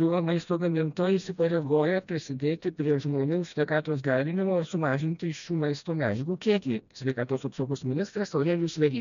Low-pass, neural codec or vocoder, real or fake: 7.2 kHz; codec, 16 kHz, 1 kbps, FreqCodec, smaller model; fake